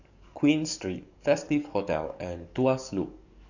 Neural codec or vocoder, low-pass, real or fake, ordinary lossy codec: codec, 44.1 kHz, 7.8 kbps, DAC; 7.2 kHz; fake; none